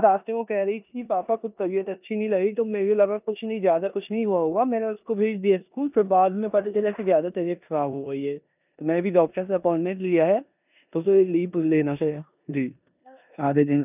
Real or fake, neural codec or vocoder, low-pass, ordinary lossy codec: fake; codec, 16 kHz in and 24 kHz out, 0.9 kbps, LongCat-Audio-Codec, four codebook decoder; 3.6 kHz; none